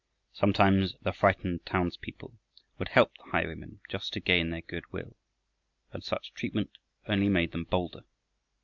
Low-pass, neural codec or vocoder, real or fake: 7.2 kHz; none; real